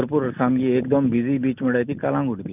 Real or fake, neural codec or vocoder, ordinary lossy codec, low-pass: real; none; none; 3.6 kHz